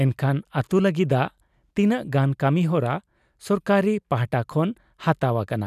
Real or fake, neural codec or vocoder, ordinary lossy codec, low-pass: real; none; none; 14.4 kHz